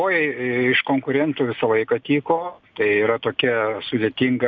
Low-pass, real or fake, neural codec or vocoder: 7.2 kHz; real; none